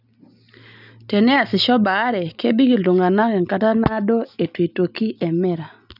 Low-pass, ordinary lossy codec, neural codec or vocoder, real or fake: 5.4 kHz; none; none; real